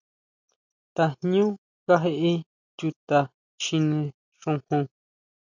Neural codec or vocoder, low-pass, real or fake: none; 7.2 kHz; real